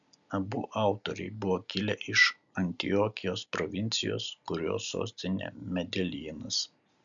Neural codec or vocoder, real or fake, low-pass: none; real; 7.2 kHz